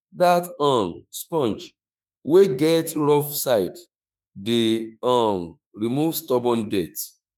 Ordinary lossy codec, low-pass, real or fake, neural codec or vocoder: none; none; fake; autoencoder, 48 kHz, 32 numbers a frame, DAC-VAE, trained on Japanese speech